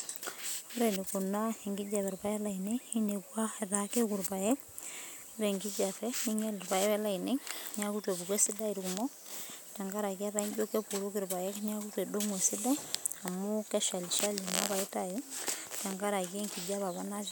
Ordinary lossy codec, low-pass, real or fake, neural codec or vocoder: none; none; real; none